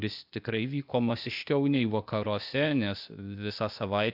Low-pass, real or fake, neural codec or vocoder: 5.4 kHz; fake; codec, 16 kHz, 0.8 kbps, ZipCodec